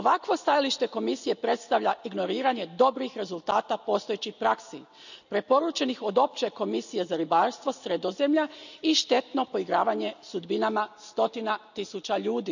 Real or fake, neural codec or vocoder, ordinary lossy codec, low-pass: real; none; none; 7.2 kHz